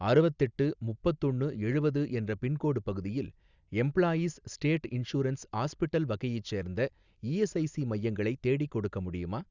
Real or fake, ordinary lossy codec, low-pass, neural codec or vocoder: real; Opus, 64 kbps; 7.2 kHz; none